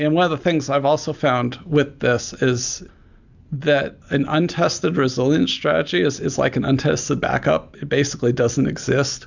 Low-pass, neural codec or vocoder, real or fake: 7.2 kHz; none; real